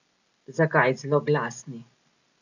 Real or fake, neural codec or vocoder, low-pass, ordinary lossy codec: real; none; 7.2 kHz; none